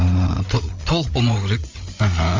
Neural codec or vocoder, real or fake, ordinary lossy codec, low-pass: codec, 16 kHz, 8 kbps, FreqCodec, larger model; fake; Opus, 32 kbps; 7.2 kHz